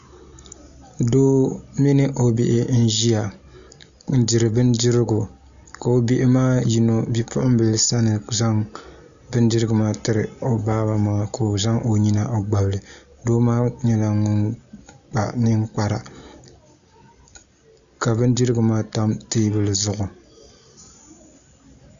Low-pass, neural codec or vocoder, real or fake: 7.2 kHz; none; real